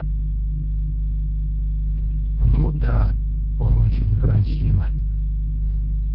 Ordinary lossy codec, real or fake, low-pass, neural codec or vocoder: MP3, 48 kbps; fake; 5.4 kHz; codec, 24 kHz, 1.5 kbps, HILCodec